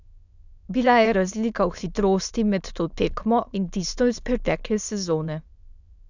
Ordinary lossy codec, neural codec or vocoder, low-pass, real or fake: none; autoencoder, 22.05 kHz, a latent of 192 numbers a frame, VITS, trained on many speakers; 7.2 kHz; fake